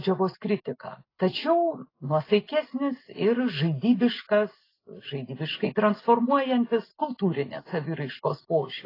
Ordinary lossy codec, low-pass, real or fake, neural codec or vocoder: AAC, 24 kbps; 5.4 kHz; real; none